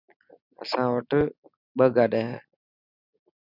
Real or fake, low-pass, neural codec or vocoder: real; 5.4 kHz; none